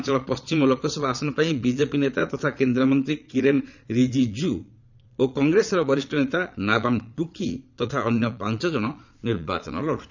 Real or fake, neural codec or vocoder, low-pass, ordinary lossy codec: fake; vocoder, 22.05 kHz, 80 mel bands, Vocos; 7.2 kHz; none